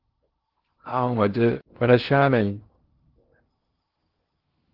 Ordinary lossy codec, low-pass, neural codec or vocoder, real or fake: Opus, 16 kbps; 5.4 kHz; codec, 16 kHz in and 24 kHz out, 0.6 kbps, FocalCodec, streaming, 2048 codes; fake